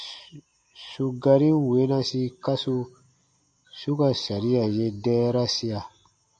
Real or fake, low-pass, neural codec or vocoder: real; 9.9 kHz; none